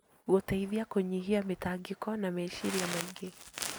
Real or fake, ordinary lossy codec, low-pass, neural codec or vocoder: real; none; none; none